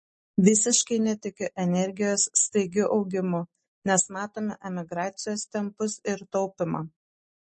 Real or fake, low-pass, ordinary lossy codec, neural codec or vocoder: real; 10.8 kHz; MP3, 32 kbps; none